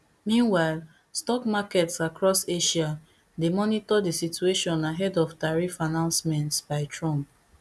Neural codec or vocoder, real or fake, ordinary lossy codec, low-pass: none; real; none; none